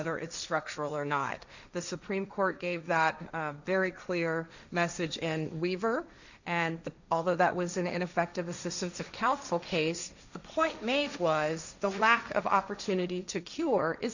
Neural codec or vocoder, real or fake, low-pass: codec, 16 kHz, 1.1 kbps, Voila-Tokenizer; fake; 7.2 kHz